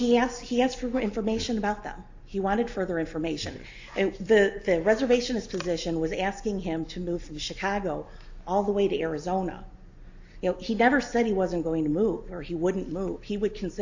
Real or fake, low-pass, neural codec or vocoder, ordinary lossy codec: real; 7.2 kHz; none; AAC, 48 kbps